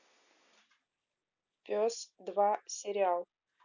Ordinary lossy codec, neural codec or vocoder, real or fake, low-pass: none; none; real; 7.2 kHz